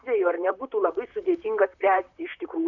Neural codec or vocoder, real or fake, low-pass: vocoder, 44.1 kHz, 128 mel bands, Pupu-Vocoder; fake; 7.2 kHz